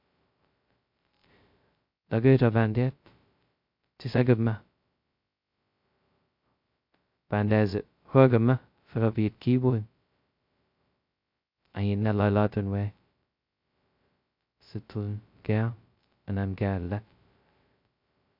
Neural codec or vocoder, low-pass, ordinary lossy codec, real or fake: codec, 16 kHz, 0.2 kbps, FocalCodec; 5.4 kHz; MP3, 48 kbps; fake